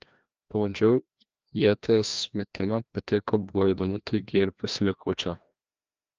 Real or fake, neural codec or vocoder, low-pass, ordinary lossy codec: fake; codec, 16 kHz, 1 kbps, FreqCodec, larger model; 7.2 kHz; Opus, 24 kbps